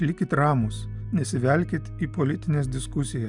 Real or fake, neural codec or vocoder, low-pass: real; none; 10.8 kHz